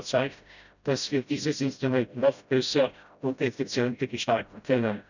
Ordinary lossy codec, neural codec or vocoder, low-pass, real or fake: none; codec, 16 kHz, 0.5 kbps, FreqCodec, smaller model; 7.2 kHz; fake